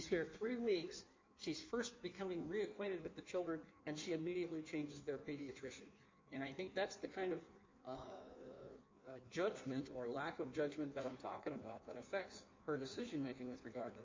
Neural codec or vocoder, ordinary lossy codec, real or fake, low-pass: codec, 16 kHz in and 24 kHz out, 1.1 kbps, FireRedTTS-2 codec; MP3, 64 kbps; fake; 7.2 kHz